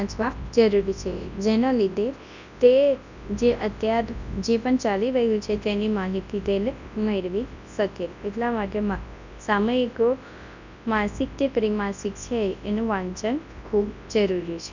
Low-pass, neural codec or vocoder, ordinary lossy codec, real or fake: 7.2 kHz; codec, 24 kHz, 0.9 kbps, WavTokenizer, large speech release; none; fake